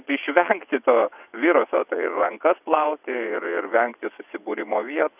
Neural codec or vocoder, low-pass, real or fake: vocoder, 22.05 kHz, 80 mel bands, WaveNeXt; 3.6 kHz; fake